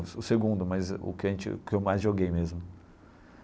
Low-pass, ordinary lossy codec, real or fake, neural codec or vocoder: none; none; real; none